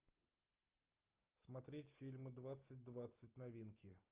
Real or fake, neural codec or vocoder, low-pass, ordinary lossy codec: real; none; 3.6 kHz; Opus, 32 kbps